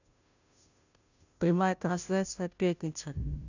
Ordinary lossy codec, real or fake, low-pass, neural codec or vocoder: none; fake; 7.2 kHz; codec, 16 kHz, 0.5 kbps, FunCodec, trained on Chinese and English, 25 frames a second